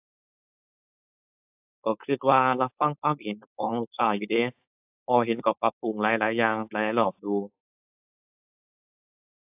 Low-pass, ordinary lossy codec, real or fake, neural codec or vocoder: 3.6 kHz; AAC, 32 kbps; fake; codec, 16 kHz, 4.8 kbps, FACodec